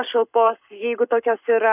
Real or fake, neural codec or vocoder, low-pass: fake; codec, 44.1 kHz, 7.8 kbps, Pupu-Codec; 3.6 kHz